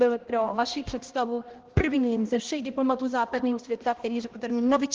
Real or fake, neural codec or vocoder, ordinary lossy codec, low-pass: fake; codec, 16 kHz, 1 kbps, X-Codec, HuBERT features, trained on balanced general audio; Opus, 16 kbps; 7.2 kHz